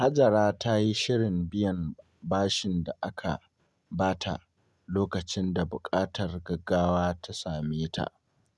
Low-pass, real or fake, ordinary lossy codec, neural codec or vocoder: none; real; none; none